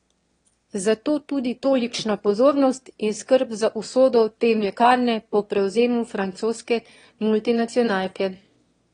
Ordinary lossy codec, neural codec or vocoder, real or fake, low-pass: AAC, 32 kbps; autoencoder, 22.05 kHz, a latent of 192 numbers a frame, VITS, trained on one speaker; fake; 9.9 kHz